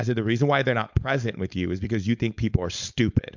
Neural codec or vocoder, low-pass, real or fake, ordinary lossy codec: codec, 16 kHz, 4.8 kbps, FACodec; 7.2 kHz; fake; MP3, 64 kbps